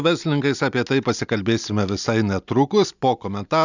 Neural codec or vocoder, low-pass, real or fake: none; 7.2 kHz; real